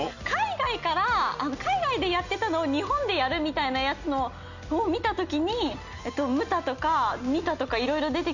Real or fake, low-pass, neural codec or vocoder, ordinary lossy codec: real; 7.2 kHz; none; none